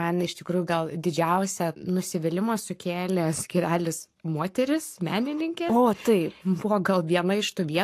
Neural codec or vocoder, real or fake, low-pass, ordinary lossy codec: codec, 44.1 kHz, 7.8 kbps, Pupu-Codec; fake; 14.4 kHz; AAC, 64 kbps